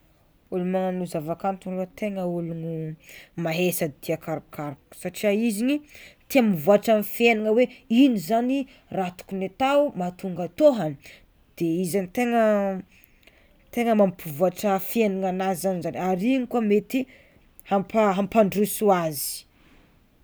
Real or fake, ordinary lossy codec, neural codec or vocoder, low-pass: real; none; none; none